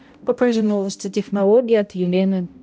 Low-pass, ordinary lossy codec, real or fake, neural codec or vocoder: none; none; fake; codec, 16 kHz, 0.5 kbps, X-Codec, HuBERT features, trained on balanced general audio